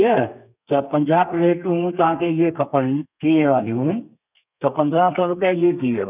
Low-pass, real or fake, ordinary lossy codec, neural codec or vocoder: 3.6 kHz; fake; none; codec, 32 kHz, 1.9 kbps, SNAC